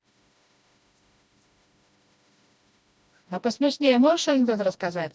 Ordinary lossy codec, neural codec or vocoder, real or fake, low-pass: none; codec, 16 kHz, 1 kbps, FreqCodec, smaller model; fake; none